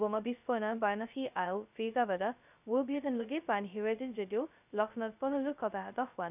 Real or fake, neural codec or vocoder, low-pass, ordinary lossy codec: fake; codec, 16 kHz, 0.2 kbps, FocalCodec; 3.6 kHz; none